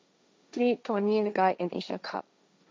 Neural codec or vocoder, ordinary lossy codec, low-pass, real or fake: codec, 16 kHz, 1.1 kbps, Voila-Tokenizer; none; none; fake